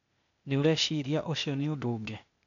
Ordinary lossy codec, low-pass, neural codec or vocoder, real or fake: none; 7.2 kHz; codec, 16 kHz, 0.8 kbps, ZipCodec; fake